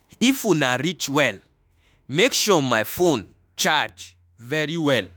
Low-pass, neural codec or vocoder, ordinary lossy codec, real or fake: none; autoencoder, 48 kHz, 32 numbers a frame, DAC-VAE, trained on Japanese speech; none; fake